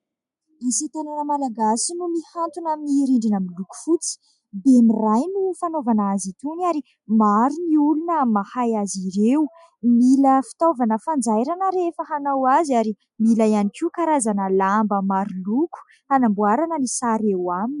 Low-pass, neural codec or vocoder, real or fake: 10.8 kHz; none; real